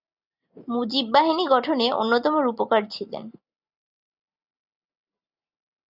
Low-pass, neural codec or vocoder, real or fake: 5.4 kHz; none; real